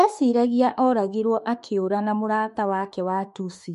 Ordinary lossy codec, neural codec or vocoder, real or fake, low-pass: MP3, 48 kbps; autoencoder, 48 kHz, 32 numbers a frame, DAC-VAE, trained on Japanese speech; fake; 14.4 kHz